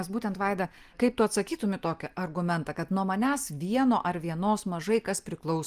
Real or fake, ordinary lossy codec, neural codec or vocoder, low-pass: real; Opus, 32 kbps; none; 14.4 kHz